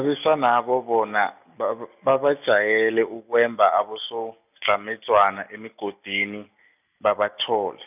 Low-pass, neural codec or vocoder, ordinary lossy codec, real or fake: 3.6 kHz; none; none; real